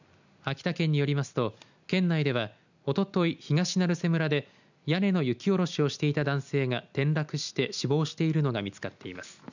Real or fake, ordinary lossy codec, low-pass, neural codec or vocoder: real; none; 7.2 kHz; none